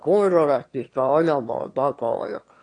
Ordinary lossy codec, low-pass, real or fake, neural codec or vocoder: AAC, 48 kbps; 9.9 kHz; fake; autoencoder, 22.05 kHz, a latent of 192 numbers a frame, VITS, trained on one speaker